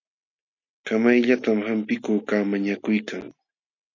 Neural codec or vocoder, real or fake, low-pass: none; real; 7.2 kHz